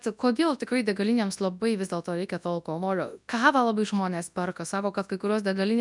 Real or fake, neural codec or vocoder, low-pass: fake; codec, 24 kHz, 0.9 kbps, WavTokenizer, large speech release; 10.8 kHz